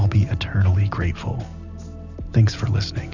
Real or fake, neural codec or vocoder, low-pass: real; none; 7.2 kHz